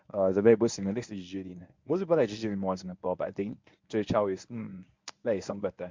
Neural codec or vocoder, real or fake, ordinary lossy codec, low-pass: codec, 24 kHz, 0.9 kbps, WavTokenizer, medium speech release version 1; fake; MP3, 64 kbps; 7.2 kHz